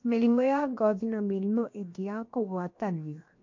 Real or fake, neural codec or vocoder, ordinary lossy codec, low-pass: fake; codec, 16 kHz, 0.7 kbps, FocalCodec; MP3, 48 kbps; 7.2 kHz